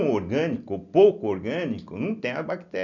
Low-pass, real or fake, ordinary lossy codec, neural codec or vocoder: 7.2 kHz; real; none; none